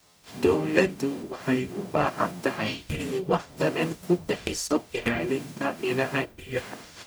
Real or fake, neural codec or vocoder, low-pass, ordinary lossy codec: fake; codec, 44.1 kHz, 0.9 kbps, DAC; none; none